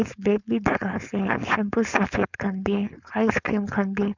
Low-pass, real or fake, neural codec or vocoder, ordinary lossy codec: 7.2 kHz; fake; codec, 16 kHz, 4.8 kbps, FACodec; none